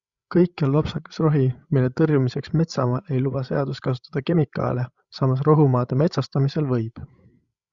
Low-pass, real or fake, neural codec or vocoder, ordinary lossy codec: 7.2 kHz; fake; codec, 16 kHz, 16 kbps, FreqCodec, larger model; Opus, 64 kbps